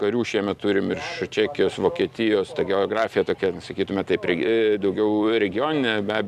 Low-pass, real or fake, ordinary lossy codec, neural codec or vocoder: 14.4 kHz; real; Opus, 64 kbps; none